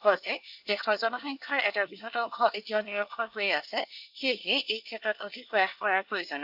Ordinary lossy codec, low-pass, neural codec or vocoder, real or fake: AAC, 48 kbps; 5.4 kHz; codec, 24 kHz, 1 kbps, SNAC; fake